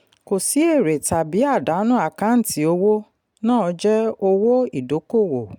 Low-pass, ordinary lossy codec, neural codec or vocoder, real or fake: 19.8 kHz; none; none; real